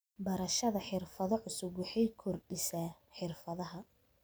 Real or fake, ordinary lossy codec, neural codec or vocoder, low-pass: real; none; none; none